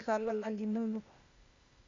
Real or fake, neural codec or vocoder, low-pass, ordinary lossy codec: fake; codec, 16 kHz, 0.8 kbps, ZipCodec; 7.2 kHz; Opus, 64 kbps